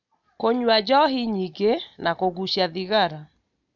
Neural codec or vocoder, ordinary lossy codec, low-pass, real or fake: none; none; 7.2 kHz; real